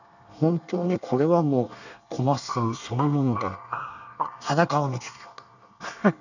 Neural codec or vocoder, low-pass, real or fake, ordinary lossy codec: codec, 24 kHz, 1 kbps, SNAC; 7.2 kHz; fake; none